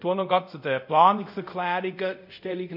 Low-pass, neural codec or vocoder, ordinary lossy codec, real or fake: 5.4 kHz; codec, 24 kHz, 0.5 kbps, DualCodec; none; fake